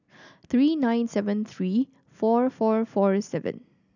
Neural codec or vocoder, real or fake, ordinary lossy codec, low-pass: none; real; none; 7.2 kHz